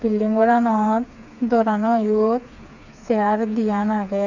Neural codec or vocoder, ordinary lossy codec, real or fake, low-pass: codec, 16 kHz, 4 kbps, FreqCodec, smaller model; none; fake; 7.2 kHz